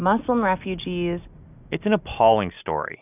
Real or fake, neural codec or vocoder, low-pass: real; none; 3.6 kHz